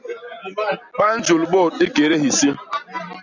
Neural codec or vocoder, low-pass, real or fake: none; 7.2 kHz; real